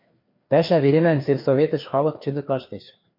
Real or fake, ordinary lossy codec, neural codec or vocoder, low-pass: fake; MP3, 32 kbps; codec, 16 kHz, 2 kbps, FreqCodec, larger model; 5.4 kHz